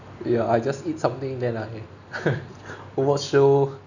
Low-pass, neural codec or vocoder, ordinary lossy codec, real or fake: 7.2 kHz; none; none; real